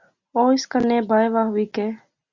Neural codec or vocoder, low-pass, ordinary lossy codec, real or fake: none; 7.2 kHz; Opus, 64 kbps; real